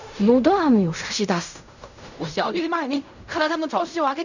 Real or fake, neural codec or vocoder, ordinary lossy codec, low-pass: fake; codec, 16 kHz in and 24 kHz out, 0.4 kbps, LongCat-Audio-Codec, fine tuned four codebook decoder; none; 7.2 kHz